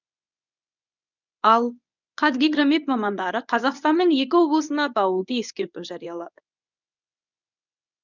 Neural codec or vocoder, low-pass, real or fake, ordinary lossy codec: codec, 24 kHz, 0.9 kbps, WavTokenizer, medium speech release version 1; 7.2 kHz; fake; none